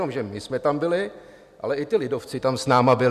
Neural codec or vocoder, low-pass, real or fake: none; 14.4 kHz; real